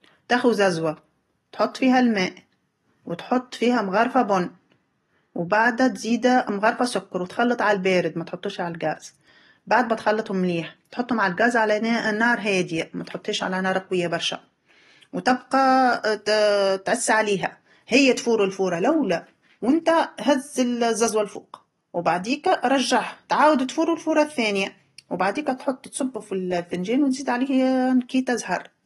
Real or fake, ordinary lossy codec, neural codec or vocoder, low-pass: real; AAC, 32 kbps; none; 19.8 kHz